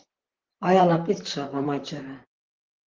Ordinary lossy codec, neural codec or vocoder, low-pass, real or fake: Opus, 16 kbps; vocoder, 44.1 kHz, 128 mel bands, Pupu-Vocoder; 7.2 kHz; fake